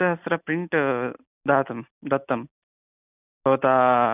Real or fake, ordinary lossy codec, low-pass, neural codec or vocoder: real; none; 3.6 kHz; none